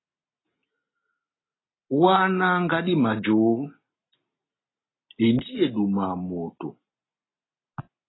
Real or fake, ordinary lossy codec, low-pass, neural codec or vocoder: real; AAC, 16 kbps; 7.2 kHz; none